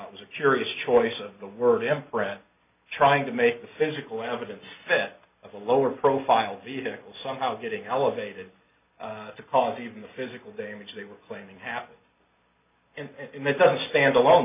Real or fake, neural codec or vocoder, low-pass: real; none; 3.6 kHz